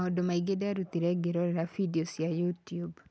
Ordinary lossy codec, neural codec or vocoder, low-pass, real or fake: none; none; none; real